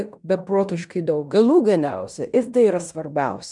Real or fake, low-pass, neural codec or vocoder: fake; 10.8 kHz; codec, 16 kHz in and 24 kHz out, 0.9 kbps, LongCat-Audio-Codec, fine tuned four codebook decoder